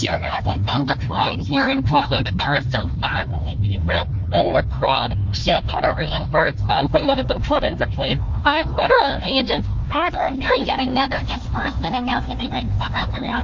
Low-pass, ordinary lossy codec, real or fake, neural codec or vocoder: 7.2 kHz; MP3, 48 kbps; fake; codec, 16 kHz, 1 kbps, FunCodec, trained on Chinese and English, 50 frames a second